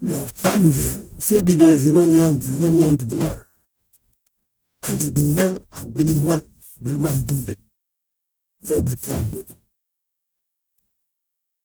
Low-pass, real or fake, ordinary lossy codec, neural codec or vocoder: none; fake; none; codec, 44.1 kHz, 0.9 kbps, DAC